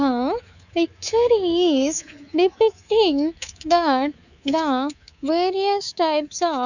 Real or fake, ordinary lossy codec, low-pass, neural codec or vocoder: fake; none; 7.2 kHz; codec, 24 kHz, 3.1 kbps, DualCodec